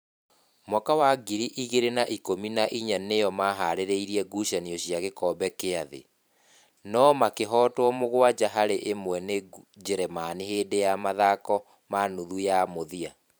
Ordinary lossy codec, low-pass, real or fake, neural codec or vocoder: none; none; fake; vocoder, 44.1 kHz, 128 mel bands every 512 samples, BigVGAN v2